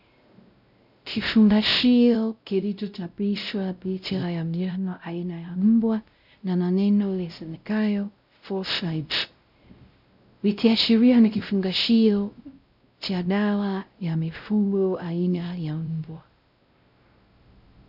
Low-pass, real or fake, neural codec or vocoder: 5.4 kHz; fake; codec, 16 kHz, 0.5 kbps, X-Codec, WavLM features, trained on Multilingual LibriSpeech